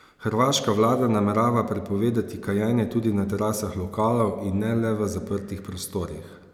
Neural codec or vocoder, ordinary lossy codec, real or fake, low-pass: none; none; real; 19.8 kHz